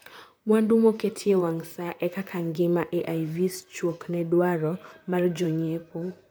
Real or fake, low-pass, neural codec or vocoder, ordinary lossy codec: fake; none; vocoder, 44.1 kHz, 128 mel bands, Pupu-Vocoder; none